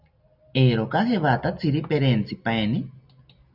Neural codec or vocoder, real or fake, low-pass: none; real; 5.4 kHz